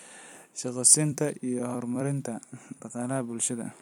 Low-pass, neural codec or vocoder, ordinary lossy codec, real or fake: 19.8 kHz; vocoder, 44.1 kHz, 128 mel bands every 256 samples, BigVGAN v2; none; fake